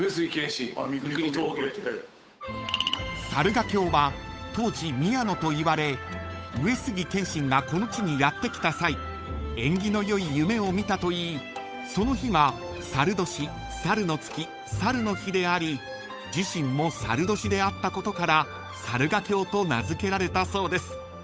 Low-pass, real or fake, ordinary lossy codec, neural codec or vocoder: none; fake; none; codec, 16 kHz, 8 kbps, FunCodec, trained on Chinese and English, 25 frames a second